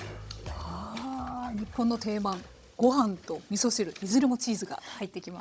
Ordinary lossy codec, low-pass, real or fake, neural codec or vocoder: none; none; fake; codec, 16 kHz, 16 kbps, FunCodec, trained on Chinese and English, 50 frames a second